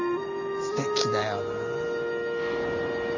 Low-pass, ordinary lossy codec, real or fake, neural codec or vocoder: 7.2 kHz; none; real; none